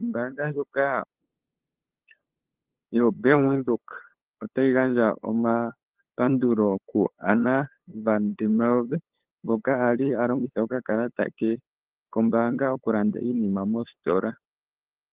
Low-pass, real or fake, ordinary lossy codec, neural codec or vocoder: 3.6 kHz; fake; Opus, 16 kbps; codec, 16 kHz, 8 kbps, FunCodec, trained on LibriTTS, 25 frames a second